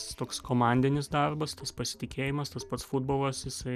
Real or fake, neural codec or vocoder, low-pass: real; none; 14.4 kHz